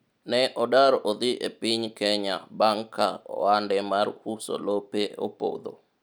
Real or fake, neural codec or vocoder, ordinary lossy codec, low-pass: real; none; none; none